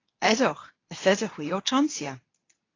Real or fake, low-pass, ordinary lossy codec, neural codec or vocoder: fake; 7.2 kHz; AAC, 32 kbps; codec, 24 kHz, 0.9 kbps, WavTokenizer, medium speech release version 2